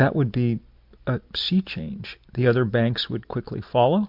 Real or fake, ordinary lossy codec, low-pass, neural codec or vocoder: real; MP3, 48 kbps; 5.4 kHz; none